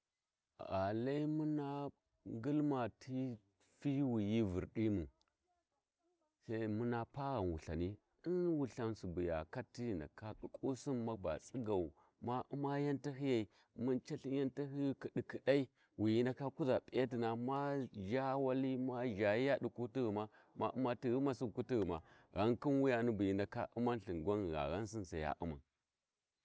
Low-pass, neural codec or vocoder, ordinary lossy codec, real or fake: none; none; none; real